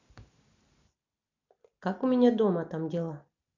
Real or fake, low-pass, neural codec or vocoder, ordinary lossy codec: real; 7.2 kHz; none; none